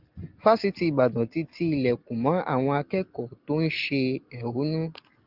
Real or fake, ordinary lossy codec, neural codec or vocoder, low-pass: real; Opus, 24 kbps; none; 5.4 kHz